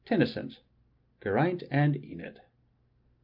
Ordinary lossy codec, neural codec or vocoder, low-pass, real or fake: Opus, 24 kbps; none; 5.4 kHz; real